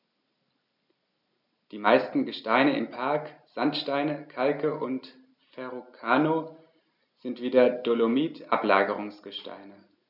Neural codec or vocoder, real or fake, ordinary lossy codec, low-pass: none; real; none; 5.4 kHz